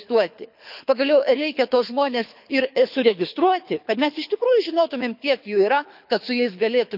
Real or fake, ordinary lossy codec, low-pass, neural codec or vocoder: fake; none; 5.4 kHz; codec, 24 kHz, 6 kbps, HILCodec